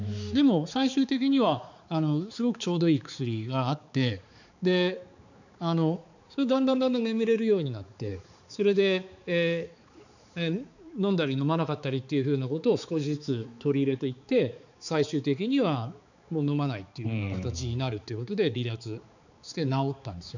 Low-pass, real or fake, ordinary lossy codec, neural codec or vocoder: 7.2 kHz; fake; none; codec, 16 kHz, 4 kbps, X-Codec, HuBERT features, trained on balanced general audio